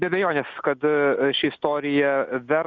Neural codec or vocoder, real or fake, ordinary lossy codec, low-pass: none; real; Opus, 64 kbps; 7.2 kHz